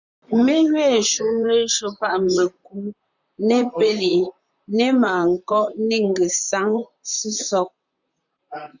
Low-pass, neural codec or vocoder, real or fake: 7.2 kHz; vocoder, 44.1 kHz, 128 mel bands, Pupu-Vocoder; fake